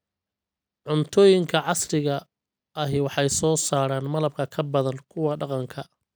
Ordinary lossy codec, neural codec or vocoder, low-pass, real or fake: none; none; none; real